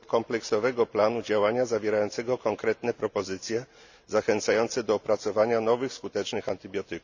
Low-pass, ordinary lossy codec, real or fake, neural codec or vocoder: 7.2 kHz; none; real; none